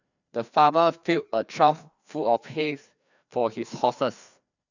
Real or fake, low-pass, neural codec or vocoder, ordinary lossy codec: fake; 7.2 kHz; codec, 16 kHz, 2 kbps, FreqCodec, larger model; none